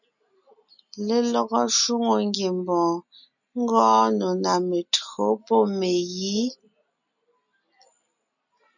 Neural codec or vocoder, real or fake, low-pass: none; real; 7.2 kHz